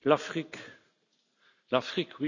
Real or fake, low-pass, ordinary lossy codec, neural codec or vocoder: real; 7.2 kHz; none; none